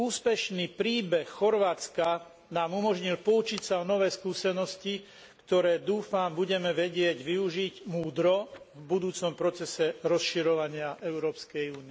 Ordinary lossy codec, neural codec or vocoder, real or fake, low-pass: none; none; real; none